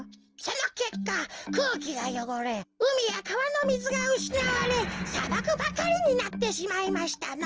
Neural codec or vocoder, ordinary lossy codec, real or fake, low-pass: none; Opus, 24 kbps; real; 7.2 kHz